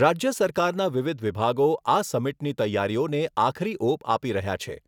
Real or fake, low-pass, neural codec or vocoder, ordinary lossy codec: real; 19.8 kHz; none; none